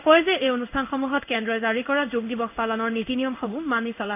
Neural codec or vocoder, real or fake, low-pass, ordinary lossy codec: codec, 16 kHz in and 24 kHz out, 1 kbps, XY-Tokenizer; fake; 3.6 kHz; none